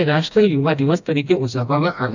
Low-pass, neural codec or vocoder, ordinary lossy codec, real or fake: 7.2 kHz; codec, 16 kHz, 1 kbps, FreqCodec, smaller model; none; fake